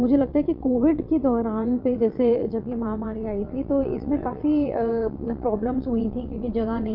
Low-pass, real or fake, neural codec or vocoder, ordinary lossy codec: 5.4 kHz; fake; vocoder, 22.05 kHz, 80 mel bands, WaveNeXt; none